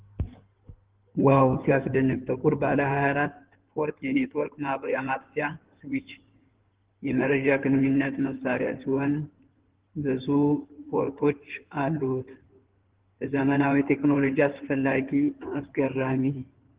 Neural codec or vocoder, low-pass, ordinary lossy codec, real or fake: codec, 16 kHz in and 24 kHz out, 2.2 kbps, FireRedTTS-2 codec; 3.6 kHz; Opus, 16 kbps; fake